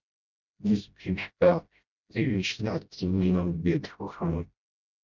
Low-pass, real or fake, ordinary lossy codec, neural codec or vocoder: 7.2 kHz; fake; none; codec, 16 kHz, 0.5 kbps, FreqCodec, smaller model